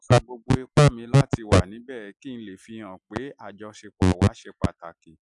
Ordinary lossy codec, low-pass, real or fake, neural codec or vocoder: MP3, 96 kbps; 10.8 kHz; real; none